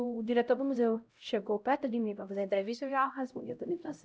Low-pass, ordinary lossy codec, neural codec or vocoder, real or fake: none; none; codec, 16 kHz, 0.5 kbps, X-Codec, HuBERT features, trained on LibriSpeech; fake